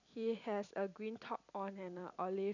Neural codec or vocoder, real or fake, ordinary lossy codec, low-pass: vocoder, 44.1 kHz, 128 mel bands every 256 samples, BigVGAN v2; fake; none; 7.2 kHz